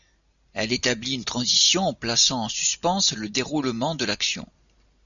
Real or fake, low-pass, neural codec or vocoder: real; 7.2 kHz; none